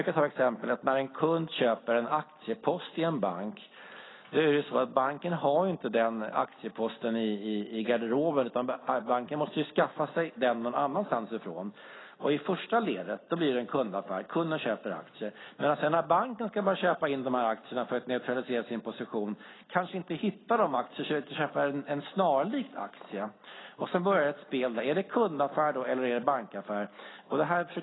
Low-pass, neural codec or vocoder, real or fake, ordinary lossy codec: 7.2 kHz; none; real; AAC, 16 kbps